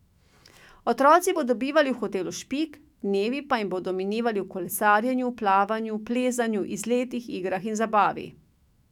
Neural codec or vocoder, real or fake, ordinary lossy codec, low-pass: autoencoder, 48 kHz, 128 numbers a frame, DAC-VAE, trained on Japanese speech; fake; none; 19.8 kHz